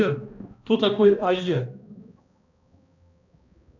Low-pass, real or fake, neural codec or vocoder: 7.2 kHz; fake; codec, 16 kHz, 1 kbps, X-Codec, HuBERT features, trained on balanced general audio